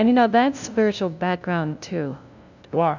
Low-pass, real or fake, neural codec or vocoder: 7.2 kHz; fake; codec, 16 kHz, 0.5 kbps, FunCodec, trained on LibriTTS, 25 frames a second